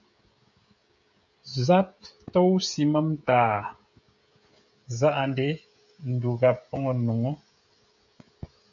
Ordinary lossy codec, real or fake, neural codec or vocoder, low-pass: AAC, 64 kbps; fake; codec, 16 kHz, 16 kbps, FreqCodec, smaller model; 7.2 kHz